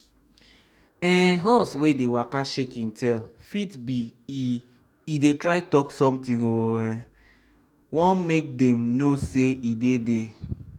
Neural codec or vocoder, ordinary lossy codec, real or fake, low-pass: codec, 44.1 kHz, 2.6 kbps, DAC; none; fake; 19.8 kHz